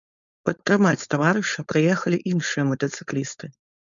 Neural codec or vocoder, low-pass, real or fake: codec, 16 kHz, 4.8 kbps, FACodec; 7.2 kHz; fake